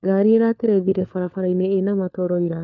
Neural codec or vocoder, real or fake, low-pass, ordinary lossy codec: codec, 16 kHz, 4 kbps, FunCodec, trained on LibriTTS, 50 frames a second; fake; 7.2 kHz; none